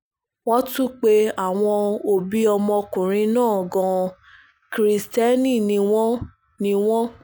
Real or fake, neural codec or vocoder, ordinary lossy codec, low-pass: real; none; none; none